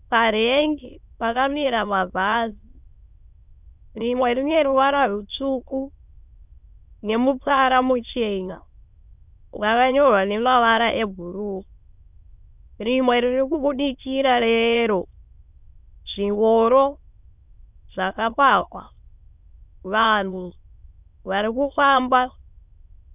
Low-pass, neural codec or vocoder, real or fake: 3.6 kHz; autoencoder, 22.05 kHz, a latent of 192 numbers a frame, VITS, trained on many speakers; fake